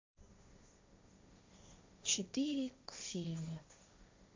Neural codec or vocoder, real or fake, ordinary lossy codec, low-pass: codec, 16 kHz, 1.1 kbps, Voila-Tokenizer; fake; MP3, 64 kbps; 7.2 kHz